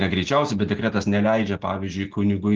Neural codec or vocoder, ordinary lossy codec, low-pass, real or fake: none; Opus, 16 kbps; 7.2 kHz; real